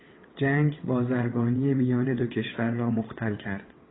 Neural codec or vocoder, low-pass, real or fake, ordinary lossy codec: vocoder, 22.05 kHz, 80 mel bands, WaveNeXt; 7.2 kHz; fake; AAC, 16 kbps